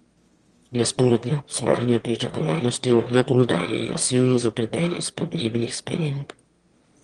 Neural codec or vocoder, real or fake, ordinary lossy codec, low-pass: autoencoder, 22.05 kHz, a latent of 192 numbers a frame, VITS, trained on one speaker; fake; Opus, 24 kbps; 9.9 kHz